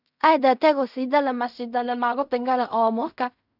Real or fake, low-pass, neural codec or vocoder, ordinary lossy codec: fake; 5.4 kHz; codec, 16 kHz in and 24 kHz out, 0.4 kbps, LongCat-Audio-Codec, fine tuned four codebook decoder; none